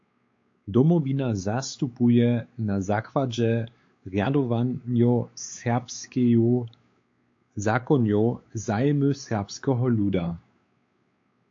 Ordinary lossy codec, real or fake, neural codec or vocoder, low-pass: AAC, 48 kbps; fake; codec, 16 kHz, 4 kbps, X-Codec, WavLM features, trained on Multilingual LibriSpeech; 7.2 kHz